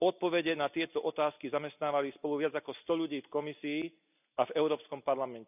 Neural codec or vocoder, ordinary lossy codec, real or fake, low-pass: none; none; real; 3.6 kHz